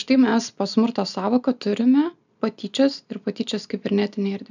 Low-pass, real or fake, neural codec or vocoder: 7.2 kHz; real; none